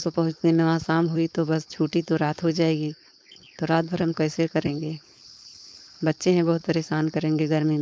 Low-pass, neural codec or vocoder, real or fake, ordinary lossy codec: none; codec, 16 kHz, 4.8 kbps, FACodec; fake; none